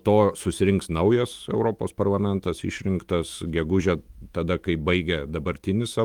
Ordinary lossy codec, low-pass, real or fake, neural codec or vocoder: Opus, 24 kbps; 19.8 kHz; fake; autoencoder, 48 kHz, 128 numbers a frame, DAC-VAE, trained on Japanese speech